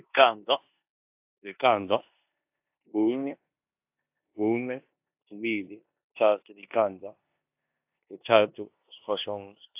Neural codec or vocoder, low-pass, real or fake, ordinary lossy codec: codec, 16 kHz in and 24 kHz out, 0.9 kbps, LongCat-Audio-Codec, four codebook decoder; 3.6 kHz; fake; AAC, 32 kbps